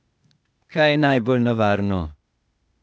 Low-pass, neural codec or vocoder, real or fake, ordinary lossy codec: none; codec, 16 kHz, 0.8 kbps, ZipCodec; fake; none